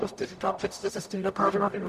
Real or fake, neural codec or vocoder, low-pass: fake; codec, 44.1 kHz, 0.9 kbps, DAC; 14.4 kHz